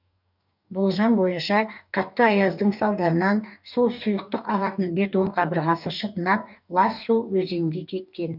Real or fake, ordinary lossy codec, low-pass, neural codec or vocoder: fake; none; 5.4 kHz; codec, 44.1 kHz, 2.6 kbps, DAC